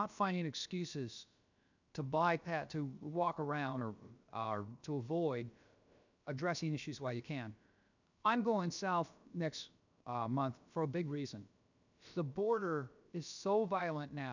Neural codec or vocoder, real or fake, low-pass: codec, 16 kHz, about 1 kbps, DyCAST, with the encoder's durations; fake; 7.2 kHz